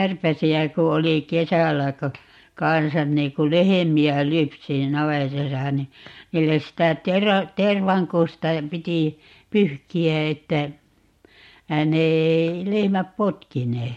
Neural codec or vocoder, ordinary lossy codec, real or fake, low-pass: none; MP3, 64 kbps; real; 19.8 kHz